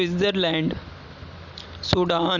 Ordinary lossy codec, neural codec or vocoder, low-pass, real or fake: none; none; 7.2 kHz; real